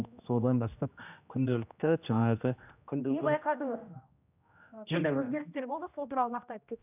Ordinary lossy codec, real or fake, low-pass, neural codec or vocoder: none; fake; 3.6 kHz; codec, 16 kHz, 1 kbps, X-Codec, HuBERT features, trained on general audio